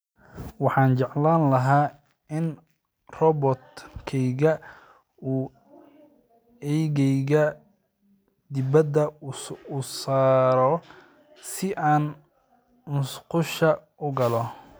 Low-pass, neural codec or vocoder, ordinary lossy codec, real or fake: none; none; none; real